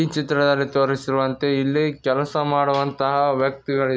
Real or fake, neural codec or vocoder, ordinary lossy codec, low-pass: real; none; none; none